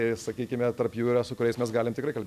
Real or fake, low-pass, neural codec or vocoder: real; 14.4 kHz; none